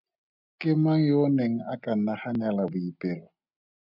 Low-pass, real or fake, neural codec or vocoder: 5.4 kHz; real; none